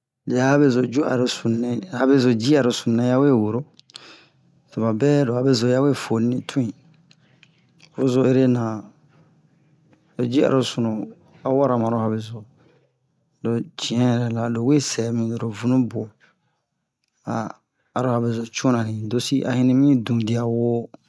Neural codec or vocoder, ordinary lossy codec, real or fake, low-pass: none; none; real; none